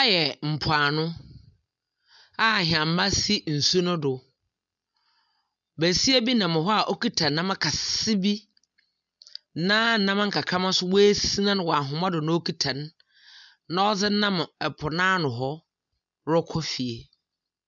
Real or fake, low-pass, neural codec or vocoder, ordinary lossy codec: real; 7.2 kHz; none; MP3, 96 kbps